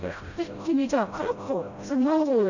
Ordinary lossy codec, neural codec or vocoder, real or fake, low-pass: none; codec, 16 kHz, 0.5 kbps, FreqCodec, smaller model; fake; 7.2 kHz